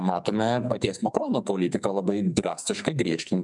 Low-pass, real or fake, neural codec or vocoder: 10.8 kHz; fake; codec, 44.1 kHz, 2.6 kbps, SNAC